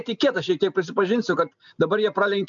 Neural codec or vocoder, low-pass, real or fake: none; 7.2 kHz; real